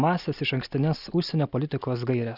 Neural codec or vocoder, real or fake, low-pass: none; real; 5.4 kHz